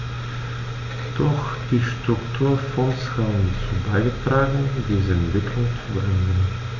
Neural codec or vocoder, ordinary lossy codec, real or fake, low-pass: none; none; real; 7.2 kHz